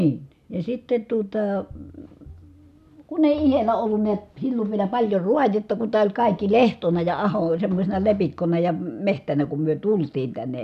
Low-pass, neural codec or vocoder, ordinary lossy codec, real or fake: 14.4 kHz; none; none; real